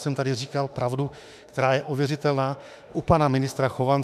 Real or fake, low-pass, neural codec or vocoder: fake; 14.4 kHz; autoencoder, 48 kHz, 32 numbers a frame, DAC-VAE, trained on Japanese speech